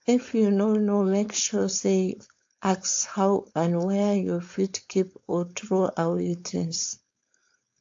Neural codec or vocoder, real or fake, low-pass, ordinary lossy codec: codec, 16 kHz, 4.8 kbps, FACodec; fake; 7.2 kHz; AAC, 48 kbps